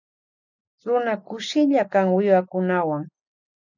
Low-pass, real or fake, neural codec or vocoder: 7.2 kHz; real; none